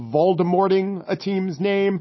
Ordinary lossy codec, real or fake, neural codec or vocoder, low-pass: MP3, 24 kbps; real; none; 7.2 kHz